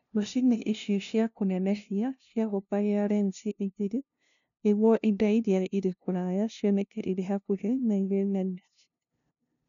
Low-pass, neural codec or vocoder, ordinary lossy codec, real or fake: 7.2 kHz; codec, 16 kHz, 0.5 kbps, FunCodec, trained on LibriTTS, 25 frames a second; none; fake